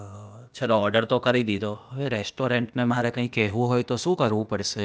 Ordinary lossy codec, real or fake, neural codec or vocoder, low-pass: none; fake; codec, 16 kHz, 0.8 kbps, ZipCodec; none